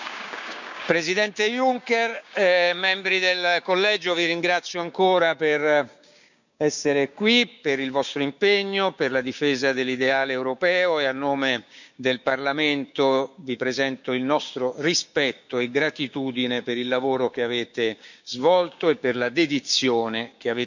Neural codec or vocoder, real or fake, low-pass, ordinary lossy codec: codec, 16 kHz, 6 kbps, DAC; fake; 7.2 kHz; none